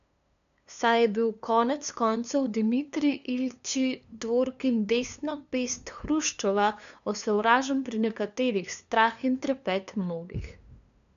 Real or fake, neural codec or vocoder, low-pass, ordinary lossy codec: fake; codec, 16 kHz, 2 kbps, FunCodec, trained on LibriTTS, 25 frames a second; 7.2 kHz; none